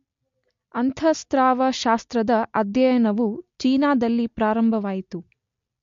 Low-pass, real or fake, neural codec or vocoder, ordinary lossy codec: 7.2 kHz; real; none; MP3, 48 kbps